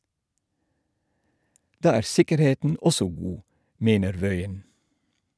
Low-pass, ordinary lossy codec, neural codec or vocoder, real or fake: none; none; none; real